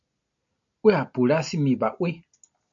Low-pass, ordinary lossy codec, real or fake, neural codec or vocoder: 7.2 kHz; AAC, 64 kbps; real; none